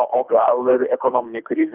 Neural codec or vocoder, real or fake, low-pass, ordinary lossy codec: codec, 24 kHz, 3 kbps, HILCodec; fake; 3.6 kHz; Opus, 24 kbps